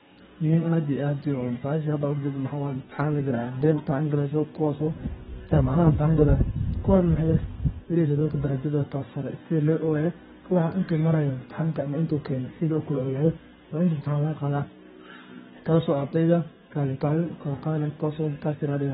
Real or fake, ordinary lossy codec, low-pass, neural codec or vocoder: fake; AAC, 16 kbps; 14.4 kHz; codec, 32 kHz, 1.9 kbps, SNAC